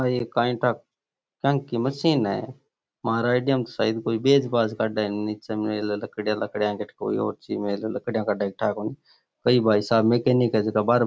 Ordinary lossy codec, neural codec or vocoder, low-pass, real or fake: none; none; none; real